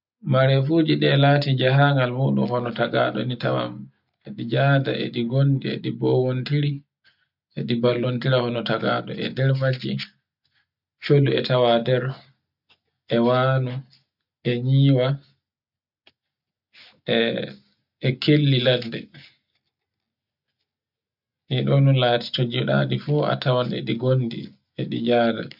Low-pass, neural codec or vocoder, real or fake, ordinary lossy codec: 5.4 kHz; none; real; none